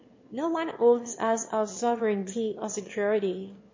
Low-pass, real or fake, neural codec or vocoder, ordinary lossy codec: 7.2 kHz; fake; autoencoder, 22.05 kHz, a latent of 192 numbers a frame, VITS, trained on one speaker; MP3, 32 kbps